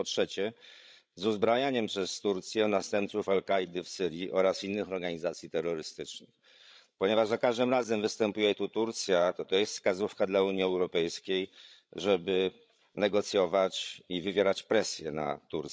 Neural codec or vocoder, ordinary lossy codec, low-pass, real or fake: codec, 16 kHz, 16 kbps, FreqCodec, larger model; none; none; fake